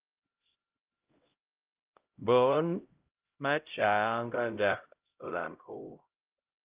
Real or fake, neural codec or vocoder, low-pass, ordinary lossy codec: fake; codec, 16 kHz, 0.5 kbps, X-Codec, HuBERT features, trained on LibriSpeech; 3.6 kHz; Opus, 32 kbps